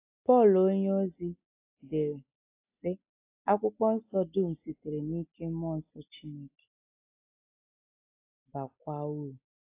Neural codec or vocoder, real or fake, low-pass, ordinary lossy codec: none; real; 3.6 kHz; AAC, 24 kbps